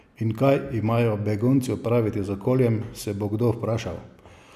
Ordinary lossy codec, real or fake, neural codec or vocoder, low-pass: none; real; none; 14.4 kHz